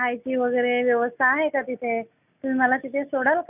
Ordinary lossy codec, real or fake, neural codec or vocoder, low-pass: none; real; none; 3.6 kHz